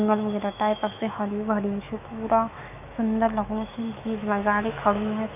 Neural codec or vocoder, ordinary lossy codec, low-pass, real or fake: autoencoder, 48 kHz, 128 numbers a frame, DAC-VAE, trained on Japanese speech; none; 3.6 kHz; fake